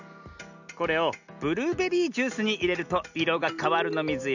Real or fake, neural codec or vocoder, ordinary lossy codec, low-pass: real; none; none; 7.2 kHz